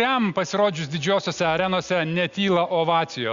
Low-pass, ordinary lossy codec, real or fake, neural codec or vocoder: 7.2 kHz; Opus, 64 kbps; real; none